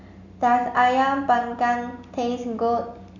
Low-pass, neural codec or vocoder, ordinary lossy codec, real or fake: 7.2 kHz; none; none; real